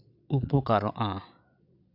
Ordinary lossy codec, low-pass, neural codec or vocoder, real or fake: none; 5.4 kHz; vocoder, 22.05 kHz, 80 mel bands, Vocos; fake